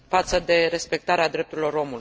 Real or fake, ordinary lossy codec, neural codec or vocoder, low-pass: real; none; none; none